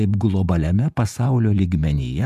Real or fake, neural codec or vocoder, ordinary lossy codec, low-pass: fake; vocoder, 48 kHz, 128 mel bands, Vocos; MP3, 96 kbps; 14.4 kHz